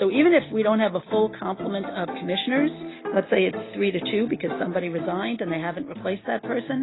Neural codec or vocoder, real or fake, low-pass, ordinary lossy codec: none; real; 7.2 kHz; AAC, 16 kbps